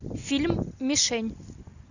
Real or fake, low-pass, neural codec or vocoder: real; 7.2 kHz; none